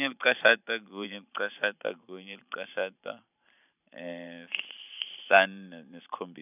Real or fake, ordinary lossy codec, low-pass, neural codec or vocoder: fake; none; 3.6 kHz; vocoder, 44.1 kHz, 128 mel bands every 512 samples, BigVGAN v2